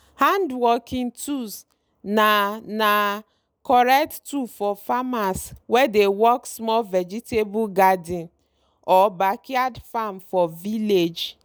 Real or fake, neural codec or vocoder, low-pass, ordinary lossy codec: real; none; none; none